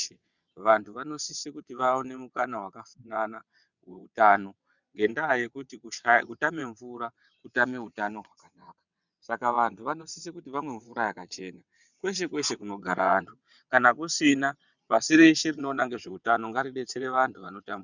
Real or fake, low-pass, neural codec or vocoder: fake; 7.2 kHz; vocoder, 22.05 kHz, 80 mel bands, WaveNeXt